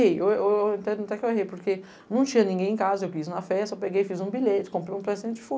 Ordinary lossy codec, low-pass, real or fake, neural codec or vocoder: none; none; real; none